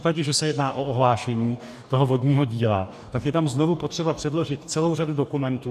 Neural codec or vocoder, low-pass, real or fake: codec, 44.1 kHz, 2.6 kbps, DAC; 14.4 kHz; fake